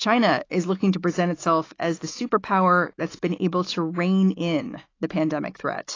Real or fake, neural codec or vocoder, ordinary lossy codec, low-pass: fake; autoencoder, 48 kHz, 128 numbers a frame, DAC-VAE, trained on Japanese speech; AAC, 32 kbps; 7.2 kHz